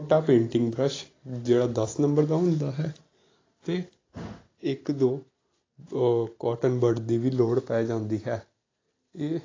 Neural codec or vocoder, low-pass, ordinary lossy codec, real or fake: none; 7.2 kHz; AAC, 32 kbps; real